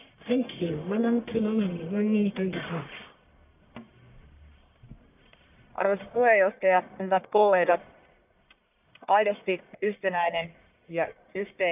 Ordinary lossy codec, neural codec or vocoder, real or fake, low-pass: none; codec, 44.1 kHz, 1.7 kbps, Pupu-Codec; fake; 3.6 kHz